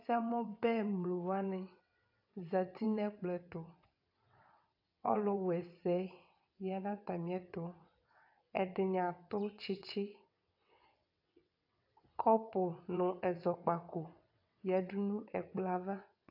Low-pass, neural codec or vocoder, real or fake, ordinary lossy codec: 5.4 kHz; vocoder, 22.05 kHz, 80 mel bands, WaveNeXt; fake; AAC, 48 kbps